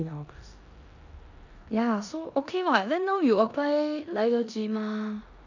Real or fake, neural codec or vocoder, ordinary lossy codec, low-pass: fake; codec, 16 kHz in and 24 kHz out, 0.9 kbps, LongCat-Audio-Codec, four codebook decoder; none; 7.2 kHz